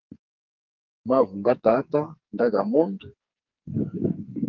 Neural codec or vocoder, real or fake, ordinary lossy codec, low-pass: codec, 44.1 kHz, 2.6 kbps, SNAC; fake; Opus, 32 kbps; 7.2 kHz